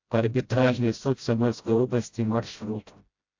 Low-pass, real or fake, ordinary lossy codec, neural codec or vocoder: 7.2 kHz; fake; AAC, 48 kbps; codec, 16 kHz, 0.5 kbps, FreqCodec, smaller model